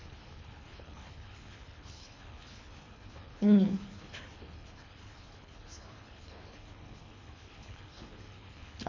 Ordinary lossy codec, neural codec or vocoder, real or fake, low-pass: MP3, 48 kbps; codec, 24 kHz, 3 kbps, HILCodec; fake; 7.2 kHz